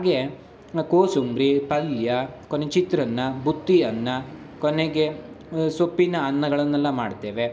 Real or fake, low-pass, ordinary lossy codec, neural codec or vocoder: real; none; none; none